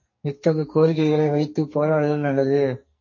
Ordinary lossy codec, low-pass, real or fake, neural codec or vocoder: MP3, 32 kbps; 7.2 kHz; fake; codec, 44.1 kHz, 2.6 kbps, SNAC